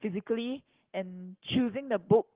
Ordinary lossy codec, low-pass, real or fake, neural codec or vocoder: Opus, 16 kbps; 3.6 kHz; fake; codec, 44.1 kHz, 7.8 kbps, Pupu-Codec